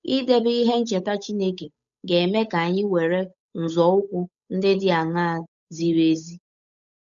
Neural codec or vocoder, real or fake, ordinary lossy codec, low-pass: codec, 16 kHz, 8 kbps, FunCodec, trained on Chinese and English, 25 frames a second; fake; none; 7.2 kHz